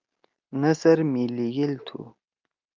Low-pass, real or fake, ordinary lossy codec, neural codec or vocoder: 7.2 kHz; real; Opus, 24 kbps; none